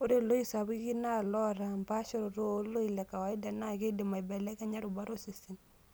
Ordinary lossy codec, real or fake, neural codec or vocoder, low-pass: none; real; none; none